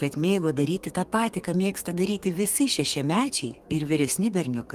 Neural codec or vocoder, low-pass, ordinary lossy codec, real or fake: codec, 32 kHz, 1.9 kbps, SNAC; 14.4 kHz; Opus, 32 kbps; fake